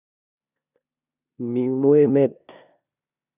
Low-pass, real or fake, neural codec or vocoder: 3.6 kHz; fake; codec, 16 kHz in and 24 kHz out, 0.9 kbps, LongCat-Audio-Codec, four codebook decoder